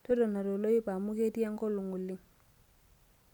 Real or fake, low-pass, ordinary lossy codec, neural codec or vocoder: real; 19.8 kHz; none; none